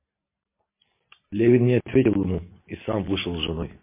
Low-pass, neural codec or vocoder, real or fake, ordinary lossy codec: 3.6 kHz; none; real; MP3, 24 kbps